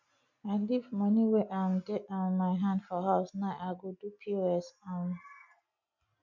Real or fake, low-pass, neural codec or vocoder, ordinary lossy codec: real; 7.2 kHz; none; none